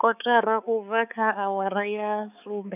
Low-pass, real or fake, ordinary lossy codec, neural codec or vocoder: 3.6 kHz; fake; none; codec, 16 kHz, 4 kbps, X-Codec, HuBERT features, trained on balanced general audio